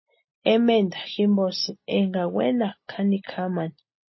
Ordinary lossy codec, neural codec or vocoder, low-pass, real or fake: MP3, 24 kbps; none; 7.2 kHz; real